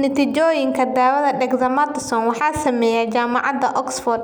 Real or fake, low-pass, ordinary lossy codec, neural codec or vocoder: real; none; none; none